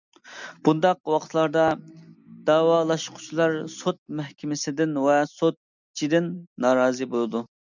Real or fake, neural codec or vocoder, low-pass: real; none; 7.2 kHz